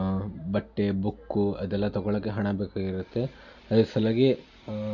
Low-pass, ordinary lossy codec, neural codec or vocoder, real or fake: 7.2 kHz; none; none; real